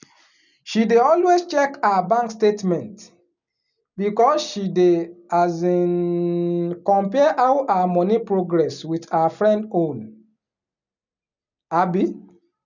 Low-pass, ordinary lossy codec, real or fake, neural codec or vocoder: 7.2 kHz; none; real; none